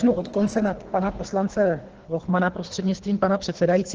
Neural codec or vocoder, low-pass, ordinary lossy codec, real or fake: codec, 24 kHz, 3 kbps, HILCodec; 7.2 kHz; Opus, 16 kbps; fake